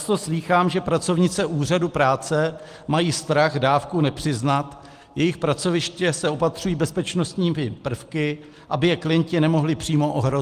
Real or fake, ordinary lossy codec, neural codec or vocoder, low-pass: real; Opus, 24 kbps; none; 14.4 kHz